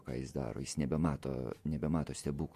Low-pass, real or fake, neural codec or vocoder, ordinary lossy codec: 14.4 kHz; fake; vocoder, 48 kHz, 128 mel bands, Vocos; MP3, 64 kbps